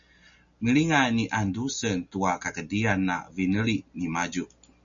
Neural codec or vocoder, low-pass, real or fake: none; 7.2 kHz; real